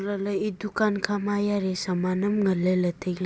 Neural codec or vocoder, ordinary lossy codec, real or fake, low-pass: none; none; real; none